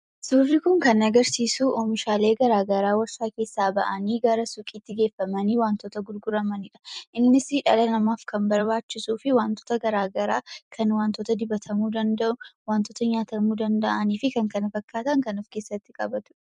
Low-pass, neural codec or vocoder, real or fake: 10.8 kHz; vocoder, 44.1 kHz, 128 mel bands every 256 samples, BigVGAN v2; fake